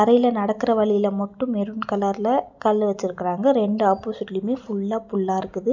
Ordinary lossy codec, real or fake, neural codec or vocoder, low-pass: none; real; none; 7.2 kHz